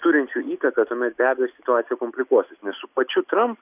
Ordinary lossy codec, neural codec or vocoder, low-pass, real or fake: AAC, 32 kbps; none; 3.6 kHz; real